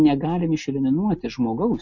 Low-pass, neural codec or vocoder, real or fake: 7.2 kHz; none; real